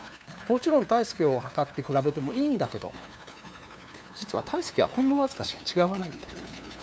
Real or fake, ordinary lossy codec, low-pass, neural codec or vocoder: fake; none; none; codec, 16 kHz, 2 kbps, FunCodec, trained on LibriTTS, 25 frames a second